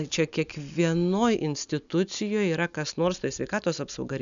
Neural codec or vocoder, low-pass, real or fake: none; 7.2 kHz; real